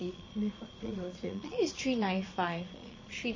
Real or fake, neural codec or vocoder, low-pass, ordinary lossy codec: fake; vocoder, 22.05 kHz, 80 mel bands, Vocos; 7.2 kHz; MP3, 32 kbps